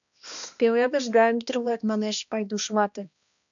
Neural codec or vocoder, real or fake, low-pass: codec, 16 kHz, 1 kbps, X-Codec, HuBERT features, trained on balanced general audio; fake; 7.2 kHz